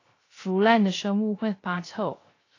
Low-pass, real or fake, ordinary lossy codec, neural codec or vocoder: 7.2 kHz; fake; AAC, 32 kbps; codec, 16 kHz, 0.3 kbps, FocalCodec